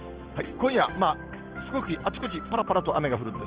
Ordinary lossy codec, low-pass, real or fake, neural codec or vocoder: Opus, 16 kbps; 3.6 kHz; real; none